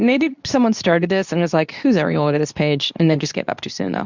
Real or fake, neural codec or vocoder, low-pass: fake; codec, 24 kHz, 0.9 kbps, WavTokenizer, medium speech release version 2; 7.2 kHz